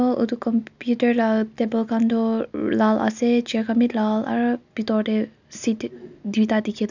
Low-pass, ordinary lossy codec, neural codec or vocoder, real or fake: 7.2 kHz; none; none; real